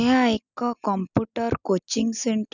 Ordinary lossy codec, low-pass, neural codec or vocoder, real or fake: none; 7.2 kHz; none; real